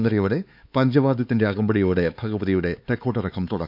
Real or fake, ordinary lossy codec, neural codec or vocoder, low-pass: fake; none; codec, 16 kHz, 4 kbps, X-Codec, HuBERT features, trained on LibriSpeech; 5.4 kHz